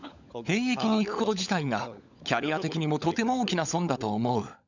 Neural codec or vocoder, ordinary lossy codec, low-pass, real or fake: codec, 16 kHz, 16 kbps, FunCodec, trained on LibriTTS, 50 frames a second; none; 7.2 kHz; fake